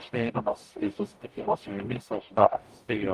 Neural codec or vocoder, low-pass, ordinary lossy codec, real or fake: codec, 44.1 kHz, 0.9 kbps, DAC; 14.4 kHz; Opus, 32 kbps; fake